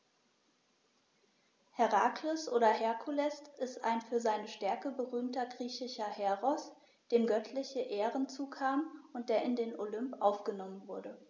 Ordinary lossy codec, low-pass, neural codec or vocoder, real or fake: none; none; none; real